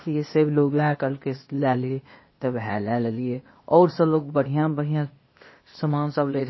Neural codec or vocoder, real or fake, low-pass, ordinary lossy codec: codec, 16 kHz, 0.8 kbps, ZipCodec; fake; 7.2 kHz; MP3, 24 kbps